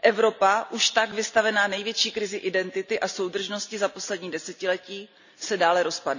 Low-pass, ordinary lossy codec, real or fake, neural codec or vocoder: 7.2 kHz; none; real; none